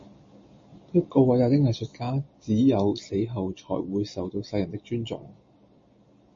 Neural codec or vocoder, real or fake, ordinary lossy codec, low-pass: none; real; MP3, 32 kbps; 7.2 kHz